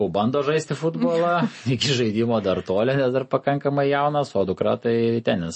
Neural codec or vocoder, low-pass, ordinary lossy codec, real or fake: none; 10.8 kHz; MP3, 32 kbps; real